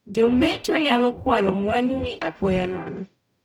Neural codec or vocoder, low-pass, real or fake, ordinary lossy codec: codec, 44.1 kHz, 0.9 kbps, DAC; 19.8 kHz; fake; none